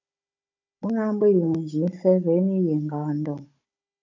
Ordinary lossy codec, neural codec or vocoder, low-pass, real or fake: MP3, 64 kbps; codec, 16 kHz, 16 kbps, FunCodec, trained on Chinese and English, 50 frames a second; 7.2 kHz; fake